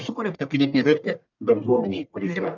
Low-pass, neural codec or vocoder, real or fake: 7.2 kHz; codec, 44.1 kHz, 1.7 kbps, Pupu-Codec; fake